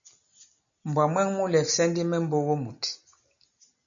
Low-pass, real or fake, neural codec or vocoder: 7.2 kHz; real; none